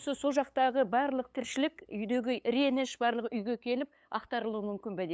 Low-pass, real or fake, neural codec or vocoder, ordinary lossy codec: none; fake; codec, 16 kHz, 8 kbps, FunCodec, trained on LibriTTS, 25 frames a second; none